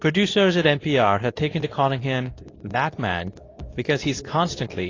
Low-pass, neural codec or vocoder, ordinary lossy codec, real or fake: 7.2 kHz; codec, 24 kHz, 0.9 kbps, WavTokenizer, medium speech release version 2; AAC, 32 kbps; fake